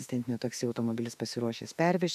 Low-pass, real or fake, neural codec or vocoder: 14.4 kHz; fake; autoencoder, 48 kHz, 32 numbers a frame, DAC-VAE, trained on Japanese speech